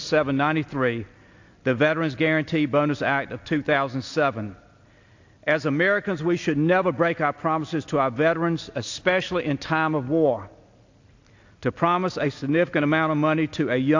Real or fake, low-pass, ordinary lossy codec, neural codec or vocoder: real; 7.2 kHz; AAC, 48 kbps; none